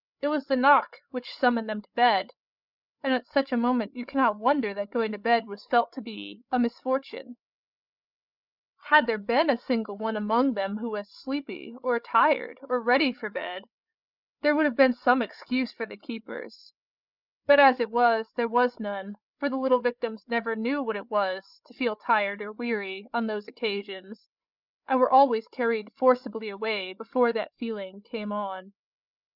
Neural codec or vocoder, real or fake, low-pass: codec, 16 kHz, 8 kbps, FreqCodec, larger model; fake; 5.4 kHz